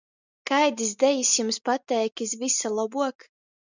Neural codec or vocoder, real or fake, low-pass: none; real; 7.2 kHz